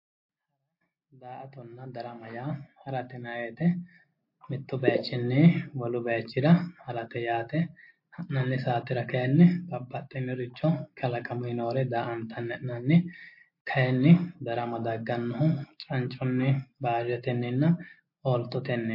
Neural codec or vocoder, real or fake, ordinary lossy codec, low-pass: none; real; MP3, 32 kbps; 5.4 kHz